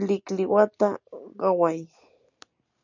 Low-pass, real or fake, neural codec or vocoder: 7.2 kHz; real; none